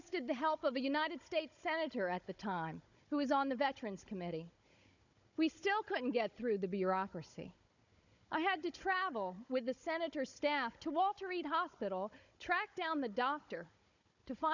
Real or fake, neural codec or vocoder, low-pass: fake; codec, 16 kHz, 16 kbps, FunCodec, trained on Chinese and English, 50 frames a second; 7.2 kHz